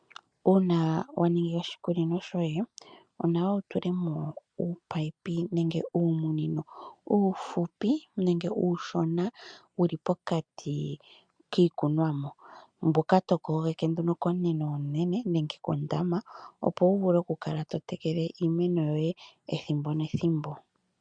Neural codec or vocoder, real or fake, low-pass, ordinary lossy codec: none; real; 9.9 kHz; MP3, 96 kbps